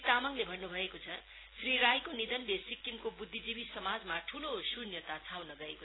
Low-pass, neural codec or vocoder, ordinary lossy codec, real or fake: 7.2 kHz; none; AAC, 16 kbps; real